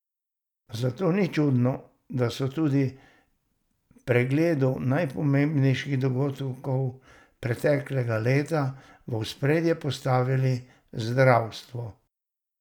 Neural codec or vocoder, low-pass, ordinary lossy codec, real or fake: none; 19.8 kHz; none; real